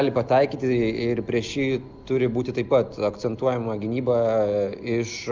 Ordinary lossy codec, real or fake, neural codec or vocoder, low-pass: Opus, 24 kbps; real; none; 7.2 kHz